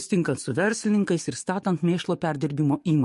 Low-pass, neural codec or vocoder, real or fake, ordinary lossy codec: 14.4 kHz; codec, 44.1 kHz, 7.8 kbps, DAC; fake; MP3, 48 kbps